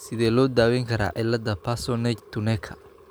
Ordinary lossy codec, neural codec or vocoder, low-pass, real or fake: none; none; none; real